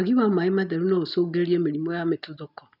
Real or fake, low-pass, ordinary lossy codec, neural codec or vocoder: real; 5.4 kHz; none; none